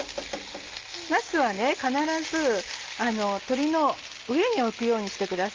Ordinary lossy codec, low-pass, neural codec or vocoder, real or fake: Opus, 32 kbps; 7.2 kHz; none; real